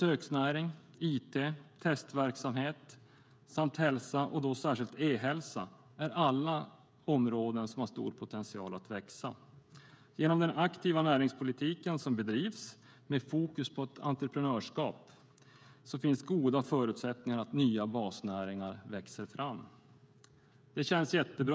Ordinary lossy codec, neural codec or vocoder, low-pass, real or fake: none; codec, 16 kHz, 16 kbps, FreqCodec, smaller model; none; fake